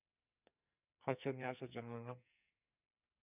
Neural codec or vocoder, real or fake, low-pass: codec, 44.1 kHz, 2.6 kbps, SNAC; fake; 3.6 kHz